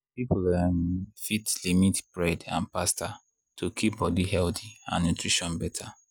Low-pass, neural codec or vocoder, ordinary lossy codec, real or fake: none; none; none; real